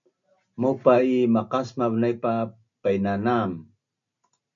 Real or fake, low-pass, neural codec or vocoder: real; 7.2 kHz; none